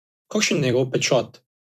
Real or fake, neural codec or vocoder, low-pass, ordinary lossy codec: fake; vocoder, 44.1 kHz, 128 mel bands every 256 samples, BigVGAN v2; 14.4 kHz; none